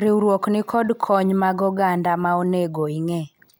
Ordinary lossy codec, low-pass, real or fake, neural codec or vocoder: none; none; real; none